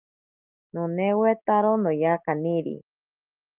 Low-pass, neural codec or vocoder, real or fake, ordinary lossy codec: 3.6 kHz; none; real; Opus, 32 kbps